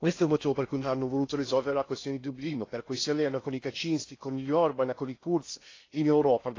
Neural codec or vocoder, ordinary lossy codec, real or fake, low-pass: codec, 16 kHz in and 24 kHz out, 0.6 kbps, FocalCodec, streaming, 2048 codes; AAC, 32 kbps; fake; 7.2 kHz